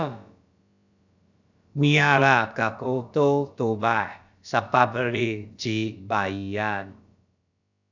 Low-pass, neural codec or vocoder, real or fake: 7.2 kHz; codec, 16 kHz, about 1 kbps, DyCAST, with the encoder's durations; fake